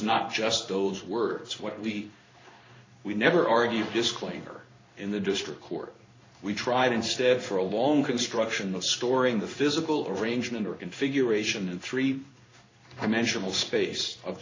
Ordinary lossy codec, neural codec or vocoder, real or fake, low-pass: AAC, 32 kbps; codec, 16 kHz in and 24 kHz out, 1 kbps, XY-Tokenizer; fake; 7.2 kHz